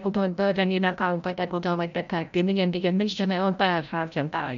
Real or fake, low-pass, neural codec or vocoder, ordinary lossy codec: fake; 7.2 kHz; codec, 16 kHz, 0.5 kbps, FreqCodec, larger model; none